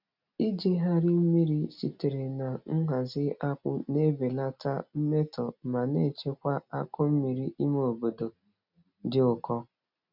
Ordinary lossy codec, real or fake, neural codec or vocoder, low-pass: none; real; none; 5.4 kHz